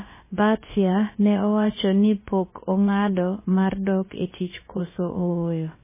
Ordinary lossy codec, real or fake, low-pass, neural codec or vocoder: MP3, 16 kbps; fake; 3.6 kHz; codec, 16 kHz, about 1 kbps, DyCAST, with the encoder's durations